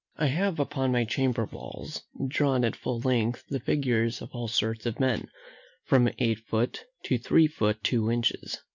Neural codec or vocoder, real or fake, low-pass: none; real; 7.2 kHz